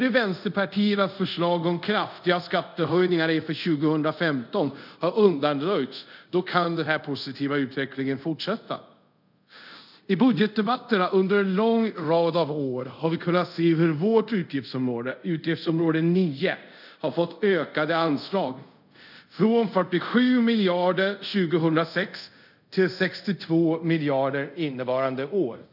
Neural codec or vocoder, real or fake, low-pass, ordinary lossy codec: codec, 24 kHz, 0.5 kbps, DualCodec; fake; 5.4 kHz; none